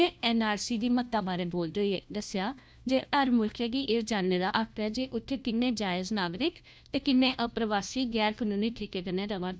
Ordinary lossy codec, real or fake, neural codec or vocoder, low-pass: none; fake; codec, 16 kHz, 1 kbps, FunCodec, trained on LibriTTS, 50 frames a second; none